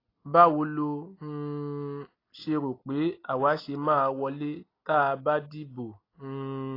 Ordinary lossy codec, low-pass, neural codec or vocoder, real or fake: AAC, 24 kbps; 5.4 kHz; none; real